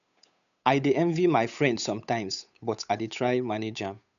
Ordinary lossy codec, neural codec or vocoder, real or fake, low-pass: none; codec, 16 kHz, 8 kbps, FunCodec, trained on Chinese and English, 25 frames a second; fake; 7.2 kHz